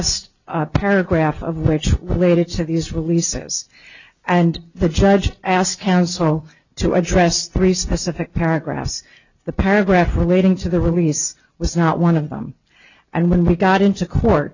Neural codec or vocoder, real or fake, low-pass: none; real; 7.2 kHz